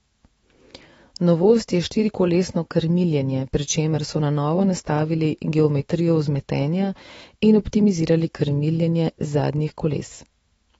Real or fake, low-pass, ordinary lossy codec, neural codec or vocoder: fake; 19.8 kHz; AAC, 24 kbps; autoencoder, 48 kHz, 128 numbers a frame, DAC-VAE, trained on Japanese speech